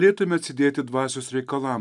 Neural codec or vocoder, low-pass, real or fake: none; 10.8 kHz; real